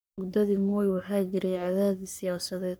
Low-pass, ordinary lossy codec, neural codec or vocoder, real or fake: none; none; codec, 44.1 kHz, 3.4 kbps, Pupu-Codec; fake